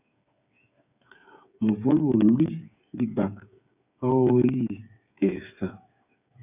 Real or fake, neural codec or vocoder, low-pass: fake; codec, 16 kHz, 8 kbps, FreqCodec, smaller model; 3.6 kHz